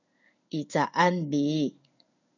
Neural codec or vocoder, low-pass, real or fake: codec, 16 kHz in and 24 kHz out, 1 kbps, XY-Tokenizer; 7.2 kHz; fake